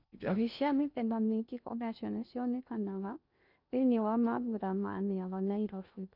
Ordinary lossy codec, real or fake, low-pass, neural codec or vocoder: none; fake; 5.4 kHz; codec, 16 kHz in and 24 kHz out, 0.6 kbps, FocalCodec, streaming, 2048 codes